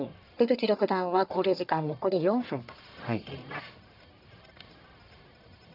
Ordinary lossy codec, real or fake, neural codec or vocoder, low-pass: none; fake; codec, 44.1 kHz, 1.7 kbps, Pupu-Codec; 5.4 kHz